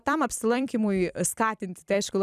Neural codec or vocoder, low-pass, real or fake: vocoder, 44.1 kHz, 128 mel bands every 256 samples, BigVGAN v2; 14.4 kHz; fake